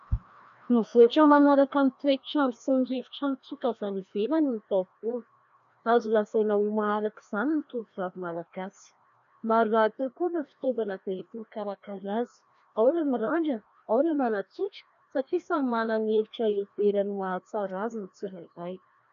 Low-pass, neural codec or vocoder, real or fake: 7.2 kHz; codec, 16 kHz, 1 kbps, FreqCodec, larger model; fake